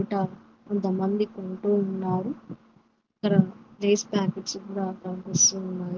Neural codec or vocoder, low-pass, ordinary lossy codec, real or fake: none; 7.2 kHz; Opus, 16 kbps; real